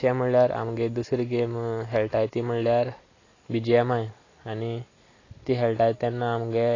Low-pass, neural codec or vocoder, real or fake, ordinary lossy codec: 7.2 kHz; none; real; AAC, 32 kbps